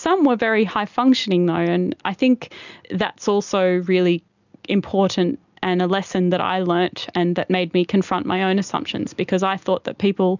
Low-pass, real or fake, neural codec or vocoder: 7.2 kHz; real; none